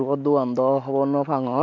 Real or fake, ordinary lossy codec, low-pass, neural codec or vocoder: real; MP3, 64 kbps; 7.2 kHz; none